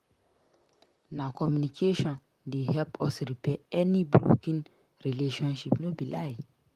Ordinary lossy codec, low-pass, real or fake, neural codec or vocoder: Opus, 32 kbps; 14.4 kHz; fake; vocoder, 44.1 kHz, 128 mel bands, Pupu-Vocoder